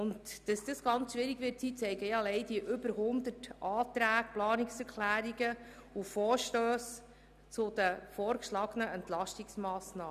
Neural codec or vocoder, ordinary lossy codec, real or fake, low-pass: none; none; real; 14.4 kHz